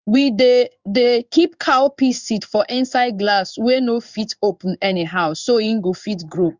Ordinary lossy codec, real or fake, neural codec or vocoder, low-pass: Opus, 64 kbps; fake; codec, 16 kHz in and 24 kHz out, 1 kbps, XY-Tokenizer; 7.2 kHz